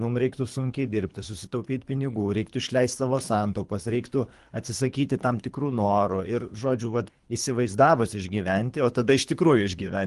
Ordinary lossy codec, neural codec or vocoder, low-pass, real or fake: Opus, 24 kbps; codec, 24 kHz, 3 kbps, HILCodec; 10.8 kHz; fake